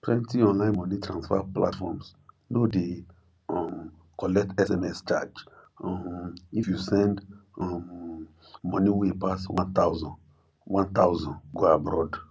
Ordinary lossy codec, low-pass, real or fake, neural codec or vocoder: none; none; real; none